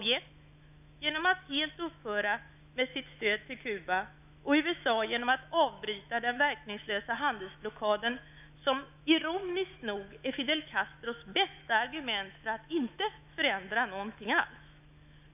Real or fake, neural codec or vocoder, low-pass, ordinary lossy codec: fake; autoencoder, 48 kHz, 128 numbers a frame, DAC-VAE, trained on Japanese speech; 3.6 kHz; none